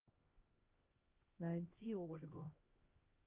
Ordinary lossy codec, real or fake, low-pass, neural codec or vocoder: Opus, 32 kbps; fake; 3.6 kHz; codec, 16 kHz, 1 kbps, X-Codec, HuBERT features, trained on LibriSpeech